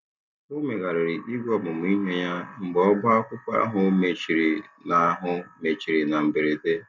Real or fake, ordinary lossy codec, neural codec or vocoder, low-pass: real; none; none; none